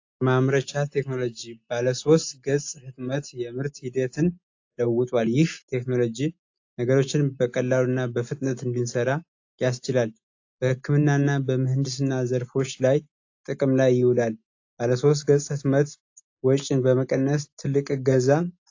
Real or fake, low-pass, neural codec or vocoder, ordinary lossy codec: real; 7.2 kHz; none; AAC, 48 kbps